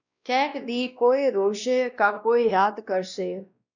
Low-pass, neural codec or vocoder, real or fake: 7.2 kHz; codec, 16 kHz, 1 kbps, X-Codec, WavLM features, trained on Multilingual LibriSpeech; fake